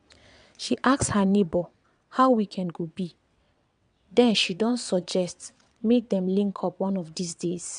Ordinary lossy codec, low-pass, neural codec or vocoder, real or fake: none; 9.9 kHz; vocoder, 22.05 kHz, 80 mel bands, WaveNeXt; fake